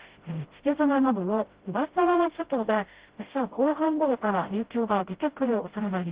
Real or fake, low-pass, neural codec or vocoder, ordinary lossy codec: fake; 3.6 kHz; codec, 16 kHz, 0.5 kbps, FreqCodec, smaller model; Opus, 16 kbps